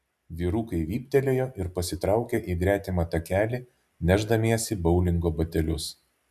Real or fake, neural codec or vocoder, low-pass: real; none; 14.4 kHz